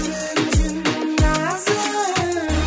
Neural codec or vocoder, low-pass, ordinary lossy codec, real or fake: none; none; none; real